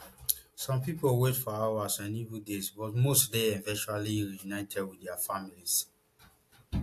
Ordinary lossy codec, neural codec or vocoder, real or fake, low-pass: AAC, 64 kbps; none; real; 14.4 kHz